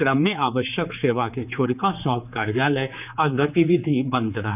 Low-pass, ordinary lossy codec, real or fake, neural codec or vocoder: 3.6 kHz; none; fake; codec, 16 kHz, 4 kbps, X-Codec, HuBERT features, trained on general audio